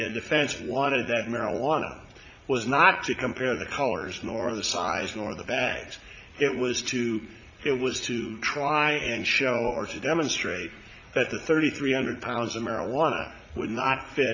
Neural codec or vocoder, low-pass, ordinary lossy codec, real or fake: vocoder, 44.1 kHz, 80 mel bands, Vocos; 7.2 kHz; MP3, 64 kbps; fake